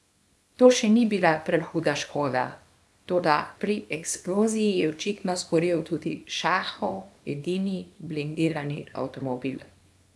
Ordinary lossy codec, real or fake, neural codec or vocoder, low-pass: none; fake; codec, 24 kHz, 0.9 kbps, WavTokenizer, small release; none